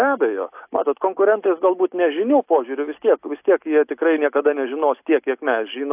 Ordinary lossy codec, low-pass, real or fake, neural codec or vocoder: AAC, 32 kbps; 3.6 kHz; real; none